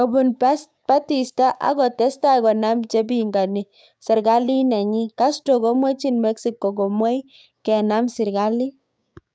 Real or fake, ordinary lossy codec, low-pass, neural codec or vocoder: fake; none; none; codec, 16 kHz, 6 kbps, DAC